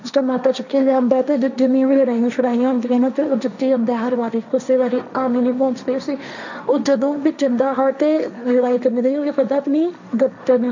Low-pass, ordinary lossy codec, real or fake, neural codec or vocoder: 7.2 kHz; none; fake; codec, 16 kHz, 1.1 kbps, Voila-Tokenizer